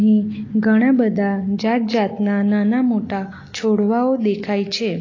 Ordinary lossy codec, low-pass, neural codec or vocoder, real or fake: AAC, 32 kbps; 7.2 kHz; none; real